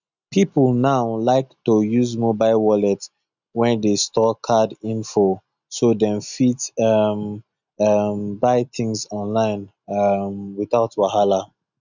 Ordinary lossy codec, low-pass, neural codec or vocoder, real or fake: none; 7.2 kHz; none; real